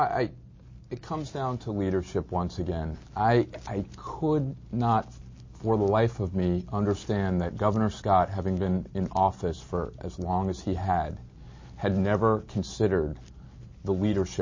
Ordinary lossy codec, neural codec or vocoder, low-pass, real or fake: MP3, 32 kbps; none; 7.2 kHz; real